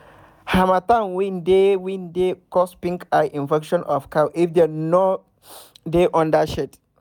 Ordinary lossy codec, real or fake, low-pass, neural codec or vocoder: none; real; 19.8 kHz; none